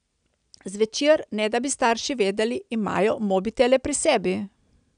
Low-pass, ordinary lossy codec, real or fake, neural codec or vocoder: 9.9 kHz; none; real; none